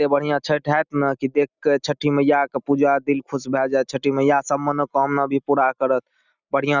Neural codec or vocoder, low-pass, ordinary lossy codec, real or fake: none; 7.2 kHz; none; real